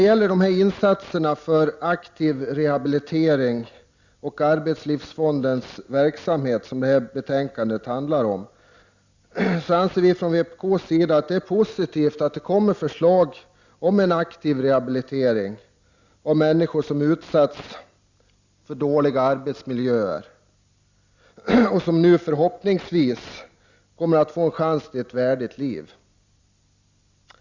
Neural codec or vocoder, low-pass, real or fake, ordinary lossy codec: none; 7.2 kHz; real; Opus, 64 kbps